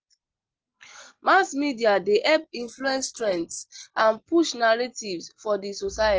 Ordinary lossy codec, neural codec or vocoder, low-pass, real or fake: Opus, 16 kbps; none; 7.2 kHz; real